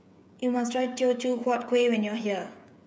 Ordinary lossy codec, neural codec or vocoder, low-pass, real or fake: none; codec, 16 kHz, 16 kbps, FreqCodec, smaller model; none; fake